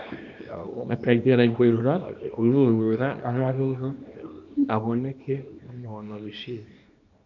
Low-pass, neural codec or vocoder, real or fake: 7.2 kHz; codec, 24 kHz, 0.9 kbps, WavTokenizer, small release; fake